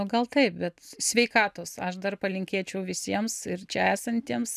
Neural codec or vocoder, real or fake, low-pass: none; real; 14.4 kHz